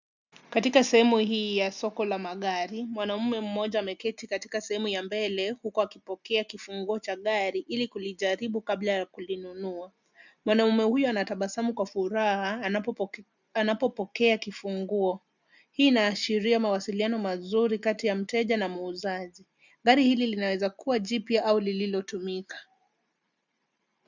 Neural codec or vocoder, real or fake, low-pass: none; real; 7.2 kHz